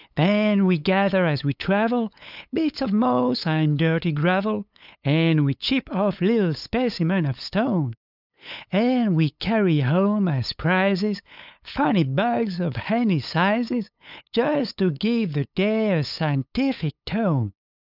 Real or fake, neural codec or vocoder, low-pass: fake; codec, 16 kHz, 8 kbps, FunCodec, trained on LibriTTS, 25 frames a second; 5.4 kHz